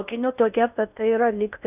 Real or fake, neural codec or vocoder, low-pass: fake; codec, 16 kHz in and 24 kHz out, 0.6 kbps, FocalCodec, streaming, 4096 codes; 3.6 kHz